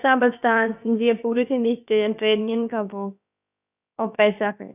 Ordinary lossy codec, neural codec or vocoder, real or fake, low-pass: none; codec, 16 kHz, about 1 kbps, DyCAST, with the encoder's durations; fake; 3.6 kHz